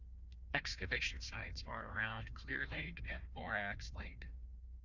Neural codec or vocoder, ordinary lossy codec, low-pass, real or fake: codec, 16 kHz, 1 kbps, FunCodec, trained on Chinese and English, 50 frames a second; Opus, 64 kbps; 7.2 kHz; fake